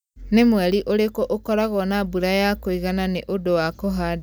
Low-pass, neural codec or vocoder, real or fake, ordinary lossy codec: none; none; real; none